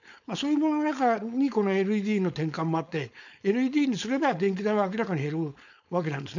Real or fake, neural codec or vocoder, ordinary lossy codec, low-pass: fake; codec, 16 kHz, 4.8 kbps, FACodec; none; 7.2 kHz